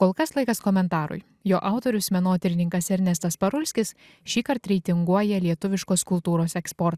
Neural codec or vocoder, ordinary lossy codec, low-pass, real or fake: none; Opus, 64 kbps; 14.4 kHz; real